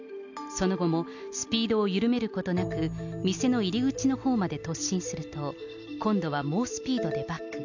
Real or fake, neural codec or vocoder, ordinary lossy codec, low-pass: real; none; none; 7.2 kHz